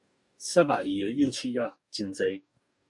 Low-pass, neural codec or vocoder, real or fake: 10.8 kHz; codec, 44.1 kHz, 2.6 kbps, DAC; fake